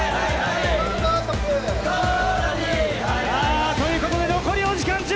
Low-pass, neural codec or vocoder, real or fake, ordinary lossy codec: none; none; real; none